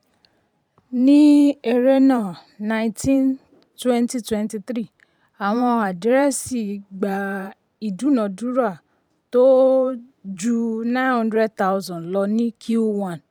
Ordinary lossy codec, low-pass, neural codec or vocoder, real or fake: none; 19.8 kHz; vocoder, 44.1 kHz, 128 mel bands every 512 samples, BigVGAN v2; fake